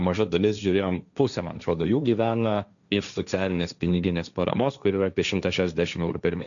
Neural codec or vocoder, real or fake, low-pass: codec, 16 kHz, 1.1 kbps, Voila-Tokenizer; fake; 7.2 kHz